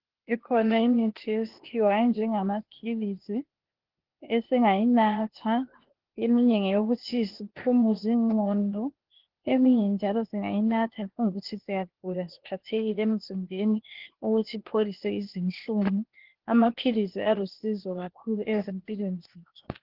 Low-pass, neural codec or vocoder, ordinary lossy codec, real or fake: 5.4 kHz; codec, 16 kHz, 0.8 kbps, ZipCodec; Opus, 16 kbps; fake